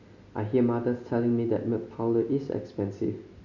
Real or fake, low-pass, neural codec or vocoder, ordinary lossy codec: real; 7.2 kHz; none; none